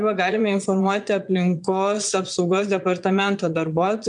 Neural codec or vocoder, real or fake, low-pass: vocoder, 22.05 kHz, 80 mel bands, Vocos; fake; 9.9 kHz